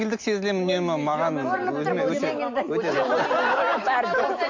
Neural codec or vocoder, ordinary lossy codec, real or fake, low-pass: none; MP3, 48 kbps; real; 7.2 kHz